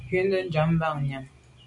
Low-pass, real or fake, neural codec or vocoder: 10.8 kHz; real; none